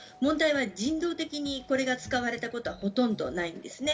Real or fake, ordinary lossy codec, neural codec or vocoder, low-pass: real; none; none; none